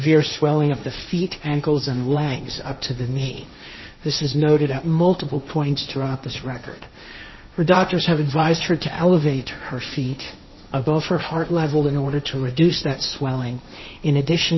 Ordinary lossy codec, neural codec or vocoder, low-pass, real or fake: MP3, 24 kbps; codec, 16 kHz, 1.1 kbps, Voila-Tokenizer; 7.2 kHz; fake